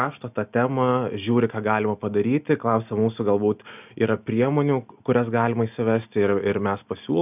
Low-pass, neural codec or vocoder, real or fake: 3.6 kHz; none; real